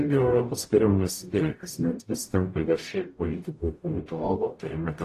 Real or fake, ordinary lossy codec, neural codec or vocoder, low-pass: fake; AAC, 48 kbps; codec, 44.1 kHz, 0.9 kbps, DAC; 14.4 kHz